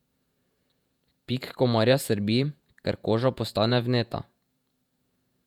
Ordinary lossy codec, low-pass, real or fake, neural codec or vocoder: none; 19.8 kHz; fake; vocoder, 48 kHz, 128 mel bands, Vocos